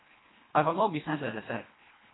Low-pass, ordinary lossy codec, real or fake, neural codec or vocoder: 7.2 kHz; AAC, 16 kbps; fake; codec, 24 kHz, 1.5 kbps, HILCodec